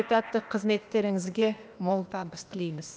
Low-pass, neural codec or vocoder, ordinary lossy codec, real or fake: none; codec, 16 kHz, 0.8 kbps, ZipCodec; none; fake